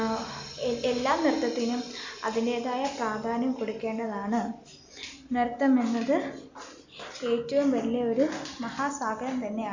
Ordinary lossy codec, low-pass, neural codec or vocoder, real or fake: Opus, 64 kbps; 7.2 kHz; none; real